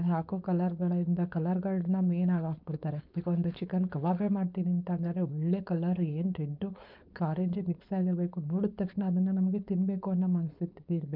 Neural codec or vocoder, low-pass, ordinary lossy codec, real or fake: codec, 16 kHz, 4.8 kbps, FACodec; 5.4 kHz; MP3, 48 kbps; fake